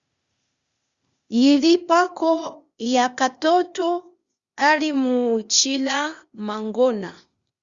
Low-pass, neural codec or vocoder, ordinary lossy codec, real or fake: 7.2 kHz; codec, 16 kHz, 0.8 kbps, ZipCodec; Opus, 64 kbps; fake